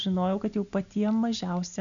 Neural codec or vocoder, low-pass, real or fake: none; 7.2 kHz; real